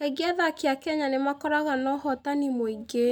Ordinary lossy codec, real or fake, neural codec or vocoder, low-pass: none; real; none; none